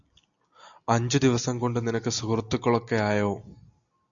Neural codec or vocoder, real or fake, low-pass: none; real; 7.2 kHz